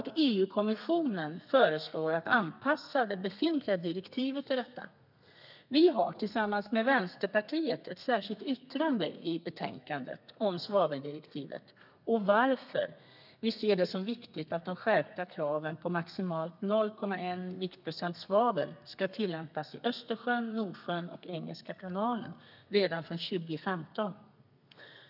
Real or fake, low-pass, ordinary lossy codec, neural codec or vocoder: fake; 5.4 kHz; none; codec, 32 kHz, 1.9 kbps, SNAC